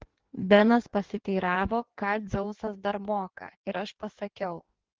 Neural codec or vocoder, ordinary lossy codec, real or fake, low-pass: codec, 16 kHz in and 24 kHz out, 1.1 kbps, FireRedTTS-2 codec; Opus, 16 kbps; fake; 7.2 kHz